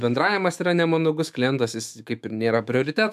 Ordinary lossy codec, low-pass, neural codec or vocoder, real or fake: MP3, 96 kbps; 14.4 kHz; autoencoder, 48 kHz, 128 numbers a frame, DAC-VAE, trained on Japanese speech; fake